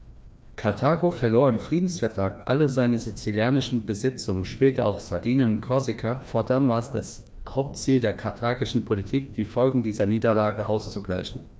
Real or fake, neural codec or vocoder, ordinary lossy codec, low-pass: fake; codec, 16 kHz, 1 kbps, FreqCodec, larger model; none; none